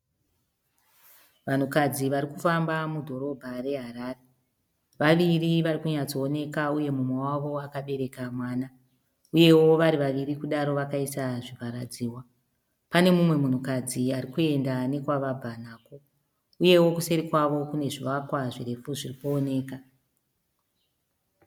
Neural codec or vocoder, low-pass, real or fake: none; 19.8 kHz; real